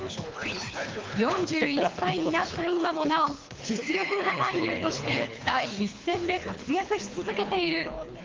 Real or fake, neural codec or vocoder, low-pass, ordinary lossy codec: fake; codec, 24 kHz, 3 kbps, HILCodec; 7.2 kHz; Opus, 24 kbps